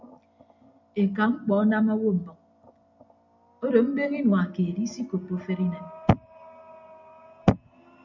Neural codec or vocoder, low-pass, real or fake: none; 7.2 kHz; real